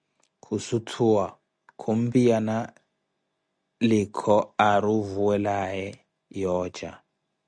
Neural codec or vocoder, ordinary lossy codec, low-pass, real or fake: none; Opus, 64 kbps; 9.9 kHz; real